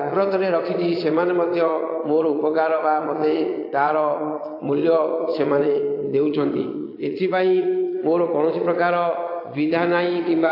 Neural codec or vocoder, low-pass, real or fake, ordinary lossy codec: vocoder, 22.05 kHz, 80 mel bands, Vocos; 5.4 kHz; fake; AAC, 32 kbps